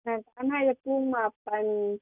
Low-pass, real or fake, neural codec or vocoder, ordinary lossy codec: 3.6 kHz; real; none; none